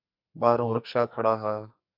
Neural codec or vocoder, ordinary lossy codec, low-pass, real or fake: codec, 44.1 kHz, 2.6 kbps, SNAC; MP3, 48 kbps; 5.4 kHz; fake